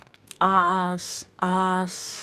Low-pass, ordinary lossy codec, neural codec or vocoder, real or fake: 14.4 kHz; none; codec, 44.1 kHz, 2.6 kbps, DAC; fake